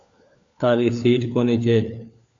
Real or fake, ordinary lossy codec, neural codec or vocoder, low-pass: fake; AAC, 64 kbps; codec, 16 kHz, 4 kbps, FunCodec, trained on LibriTTS, 50 frames a second; 7.2 kHz